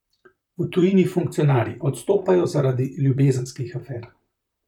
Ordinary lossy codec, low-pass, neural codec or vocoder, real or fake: none; 19.8 kHz; vocoder, 44.1 kHz, 128 mel bands, Pupu-Vocoder; fake